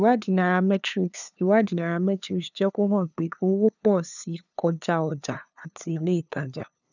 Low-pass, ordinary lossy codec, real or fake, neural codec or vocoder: 7.2 kHz; none; fake; codec, 16 kHz, 2 kbps, FunCodec, trained on LibriTTS, 25 frames a second